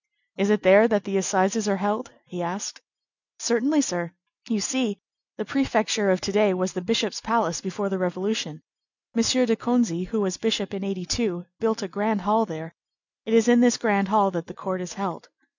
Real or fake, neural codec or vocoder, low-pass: real; none; 7.2 kHz